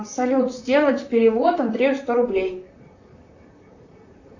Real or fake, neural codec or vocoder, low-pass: fake; vocoder, 44.1 kHz, 128 mel bands, Pupu-Vocoder; 7.2 kHz